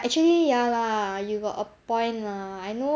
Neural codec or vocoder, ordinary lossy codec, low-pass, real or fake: none; none; none; real